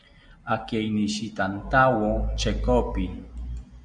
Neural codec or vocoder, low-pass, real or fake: none; 9.9 kHz; real